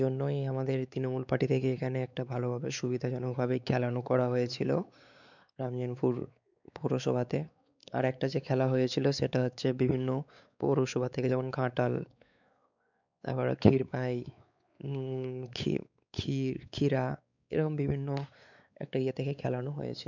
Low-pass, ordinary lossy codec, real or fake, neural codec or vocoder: 7.2 kHz; none; fake; codec, 16 kHz, 4 kbps, X-Codec, WavLM features, trained on Multilingual LibriSpeech